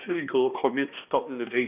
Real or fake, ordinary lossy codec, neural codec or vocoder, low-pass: fake; none; codec, 16 kHz, 1 kbps, X-Codec, HuBERT features, trained on balanced general audio; 3.6 kHz